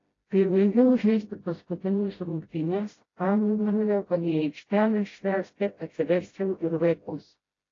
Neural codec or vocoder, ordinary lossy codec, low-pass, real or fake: codec, 16 kHz, 0.5 kbps, FreqCodec, smaller model; AAC, 32 kbps; 7.2 kHz; fake